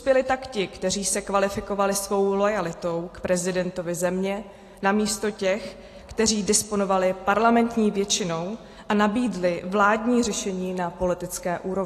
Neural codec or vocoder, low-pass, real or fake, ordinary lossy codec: none; 14.4 kHz; real; AAC, 48 kbps